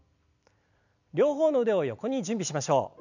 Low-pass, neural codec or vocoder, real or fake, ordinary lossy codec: 7.2 kHz; vocoder, 44.1 kHz, 128 mel bands every 256 samples, BigVGAN v2; fake; none